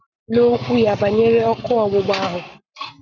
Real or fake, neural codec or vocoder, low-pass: fake; codec, 44.1 kHz, 7.8 kbps, Pupu-Codec; 7.2 kHz